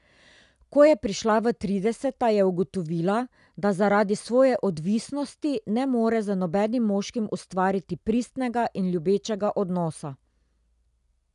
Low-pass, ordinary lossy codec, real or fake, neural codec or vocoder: 10.8 kHz; none; real; none